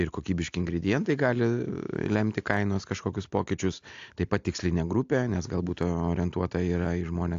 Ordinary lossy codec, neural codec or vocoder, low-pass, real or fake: AAC, 64 kbps; none; 7.2 kHz; real